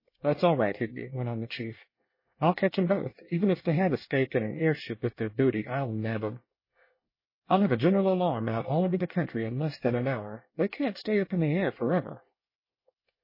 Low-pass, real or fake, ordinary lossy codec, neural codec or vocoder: 5.4 kHz; fake; MP3, 24 kbps; codec, 24 kHz, 1 kbps, SNAC